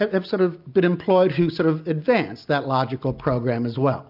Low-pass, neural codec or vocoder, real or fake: 5.4 kHz; none; real